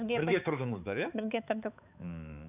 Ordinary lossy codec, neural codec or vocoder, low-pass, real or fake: none; codec, 16 kHz, 4 kbps, X-Codec, HuBERT features, trained on balanced general audio; 3.6 kHz; fake